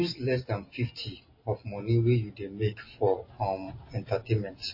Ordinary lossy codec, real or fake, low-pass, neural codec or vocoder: MP3, 24 kbps; real; 5.4 kHz; none